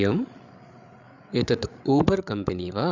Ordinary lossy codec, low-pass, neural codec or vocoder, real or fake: Opus, 64 kbps; 7.2 kHz; codec, 16 kHz, 8 kbps, FreqCodec, larger model; fake